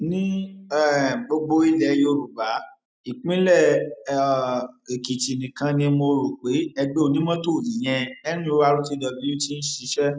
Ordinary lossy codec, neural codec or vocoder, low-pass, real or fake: none; none; none; real